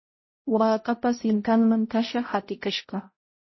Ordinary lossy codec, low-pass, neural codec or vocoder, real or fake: MP3, 24 kbps; 7.2 kHz; codec, 16 kHz, 0.5 kbps, X-Codec, HuBERT features, trained on balanced general audio; fake